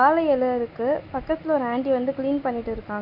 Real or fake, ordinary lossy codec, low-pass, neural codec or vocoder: real; none; 5.4 kHz; none